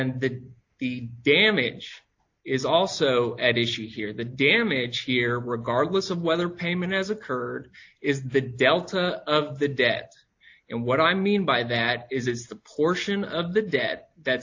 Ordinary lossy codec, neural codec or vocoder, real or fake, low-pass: AAC, 48 kbps; none; real; 7.2 kHz